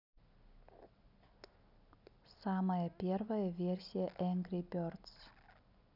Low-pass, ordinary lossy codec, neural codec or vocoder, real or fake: 5.4 kHz; none; none; real